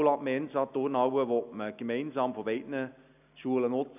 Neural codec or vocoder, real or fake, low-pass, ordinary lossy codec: none; real; 3.6 kHz; none